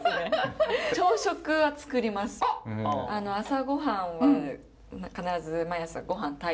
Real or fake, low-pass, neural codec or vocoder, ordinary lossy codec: real; none; none; none